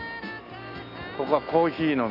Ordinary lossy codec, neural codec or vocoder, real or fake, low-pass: none; none; real; 5.4 kHz